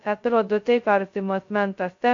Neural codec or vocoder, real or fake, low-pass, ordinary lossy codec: codec, 16 kHz, 0.2 kbps, FocalCodec; fake; 7.2 kHz; AAC, 48 kbps